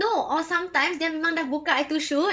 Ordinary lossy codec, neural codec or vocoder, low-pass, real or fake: none; codec, 16 kHz, 16 kbps, FreqCodec, larger model; none; fake